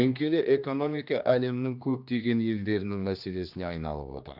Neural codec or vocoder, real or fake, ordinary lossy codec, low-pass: codec, 16 kHz, 2 kbps, X-Codec, HuBERT features, trained on general audio; fake; AAC, 48 kbps; 5.4 kHz